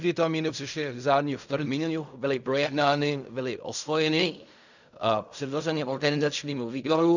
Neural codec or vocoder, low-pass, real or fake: codec, 16 kHz in and 24 kHz out, 0.4 kbps, LongCat-Audio-Codec, fine tuned four codebook decoder; 7.2 kHz; fake